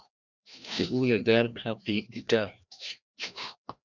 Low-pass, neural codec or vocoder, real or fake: 7.2 kHz; codec, 16 kHz, 1 kbps, FreqCodec, larger model; fake